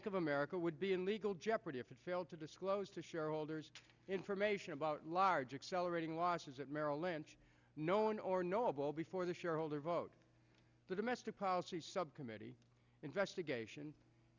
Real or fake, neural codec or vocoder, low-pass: real; none; 7.2 kHz